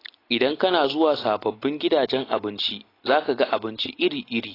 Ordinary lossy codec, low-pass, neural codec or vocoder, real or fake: AAC, 24 kbps; 5.4 kHz; none; real